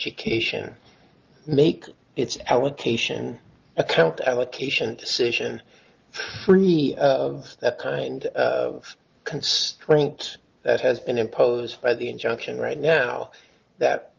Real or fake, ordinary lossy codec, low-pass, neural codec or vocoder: real; Opus, 32 kbps; 7.2 kHz; none